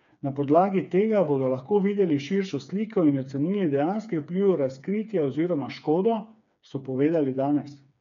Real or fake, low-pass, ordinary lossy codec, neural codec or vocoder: fake; 7.2 kHz; none; codec, 16 kHz, 4 kbps, FreqCodec, smaller model